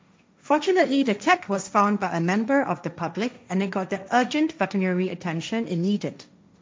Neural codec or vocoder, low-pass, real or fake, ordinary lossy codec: codec, 16 kHz, 1.1 kbps, Voila-Tokenizer; none; fake; none